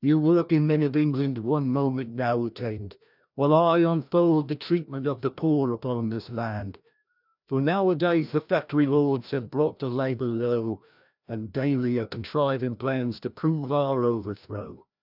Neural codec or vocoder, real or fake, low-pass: codec, 16 kHz, 1 kbps, FreqCodec, larger model; fake; 5.4 kHz